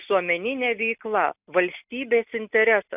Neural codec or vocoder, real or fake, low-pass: none; real; 3.6 kHz